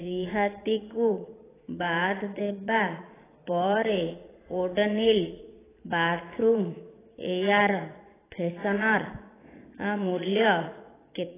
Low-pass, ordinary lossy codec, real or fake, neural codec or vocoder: 3.6 kHz; AAC, 16 kbps; fake; vocoder, 22.05 kHz, 80 mel bands, Vocos